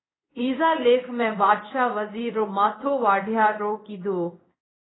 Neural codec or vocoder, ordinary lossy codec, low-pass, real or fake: codec, 16 kHz in and 24 kHz out, 1 kbps, XY-Tokenizer; AAC, 16 kbps; 7.2 kHz; fake